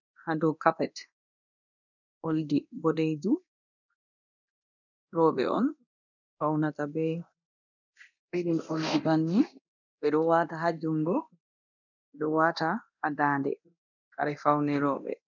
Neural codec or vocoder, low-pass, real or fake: codec, 24 kHz, 0.9 kbps, DualCodec; 7.2 kHz; fake